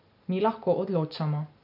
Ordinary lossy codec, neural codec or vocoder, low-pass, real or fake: none; none; 5.4 kHz; real